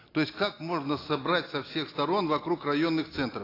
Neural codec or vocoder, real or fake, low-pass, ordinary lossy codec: none; real; 5.4 kHz; AAC, 24 kbps